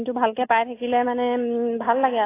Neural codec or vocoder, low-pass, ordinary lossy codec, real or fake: none; 3.6 kHz; AAC, 16 kbps; real